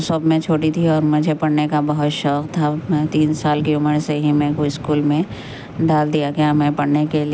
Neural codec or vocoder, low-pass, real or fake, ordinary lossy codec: none; none; real; none